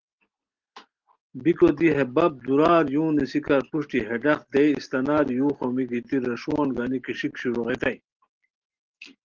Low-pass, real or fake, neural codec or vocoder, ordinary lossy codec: 7.2 kHz; real; none; Opus, 16 kbps